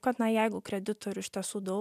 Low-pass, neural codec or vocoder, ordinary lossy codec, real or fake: 14.4 kHz; none; MP3, 96 kbps; real